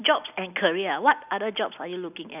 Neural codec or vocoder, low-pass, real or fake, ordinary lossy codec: none; 3.6 kHz; real; none